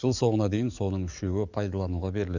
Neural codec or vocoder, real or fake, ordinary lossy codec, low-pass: codec, 44.1 kHz, 7.8 kbps, DAC; fake; none; 7.2 kHz